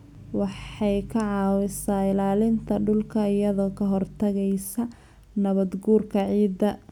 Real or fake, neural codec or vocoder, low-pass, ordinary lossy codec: real; none; 19.8 kHz; none